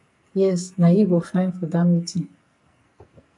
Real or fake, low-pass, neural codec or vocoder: fake; 10.8 kHz; codec, 44.1 kHz, 2.6 kbps, SNAC